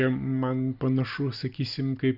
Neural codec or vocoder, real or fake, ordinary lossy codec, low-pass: none; real; Opus, 64 kbps; 5.4 kHz